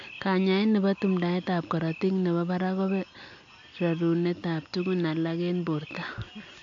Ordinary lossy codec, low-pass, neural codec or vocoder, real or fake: AAC, 48 kbps; 7.2 kHz; none; real